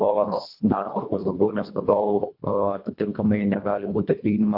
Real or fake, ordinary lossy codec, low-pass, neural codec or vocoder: fake; AAC, 48 kbps; 5.4 kHz; codec, 24 kHz, 1.5 kbps, HILCodec